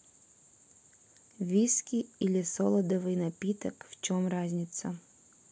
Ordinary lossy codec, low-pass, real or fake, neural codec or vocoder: none; none; real; none